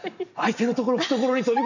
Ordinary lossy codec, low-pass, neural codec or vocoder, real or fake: none; 7.2 kHz; none; real